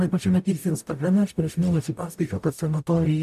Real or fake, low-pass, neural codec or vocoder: fake; 14.4 kHz; codec, 44.1 kHz, 0.9 kbps, DAC